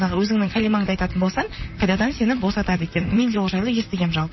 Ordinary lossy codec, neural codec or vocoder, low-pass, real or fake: MP3, 24 kbps; vocoder, 44.1 kHz, 128 mel bands, Pupu-Vocoder; 7.2 kHz; fake